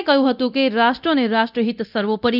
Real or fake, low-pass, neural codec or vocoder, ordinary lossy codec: fake; 5.4 kHz; codec, 24 kHz, 0.9 kbps, DualCodec; none